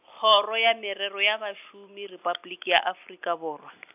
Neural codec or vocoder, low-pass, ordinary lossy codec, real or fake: none; 3.6 kHz; AAC, 32 kbps; real